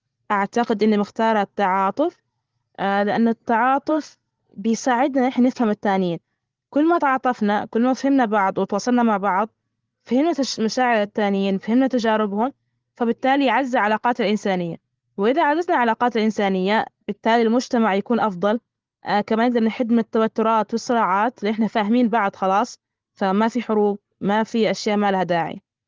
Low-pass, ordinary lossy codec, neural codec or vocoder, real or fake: 7.2 kHz; Opus, 16 kbps; none; real